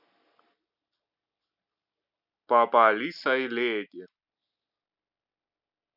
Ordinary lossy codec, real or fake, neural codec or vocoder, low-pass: none; real; none; 5.4 kHz